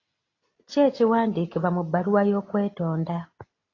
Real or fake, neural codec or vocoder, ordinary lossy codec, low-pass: real; none; AAC, 32 kbps; 7.2 kHz